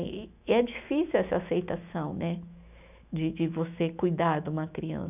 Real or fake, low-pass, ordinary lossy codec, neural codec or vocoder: fake; 3.6 kHz; none; autoencoder, 48 kHz, 128 numbers a frame, DAC-VAE, trained on Japanese speech